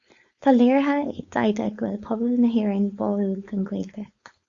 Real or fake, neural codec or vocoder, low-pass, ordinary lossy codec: fake; codec, 16 kHz, 4.8 kbps, FACodec; 7.2 kHz; Opus, 64 kbps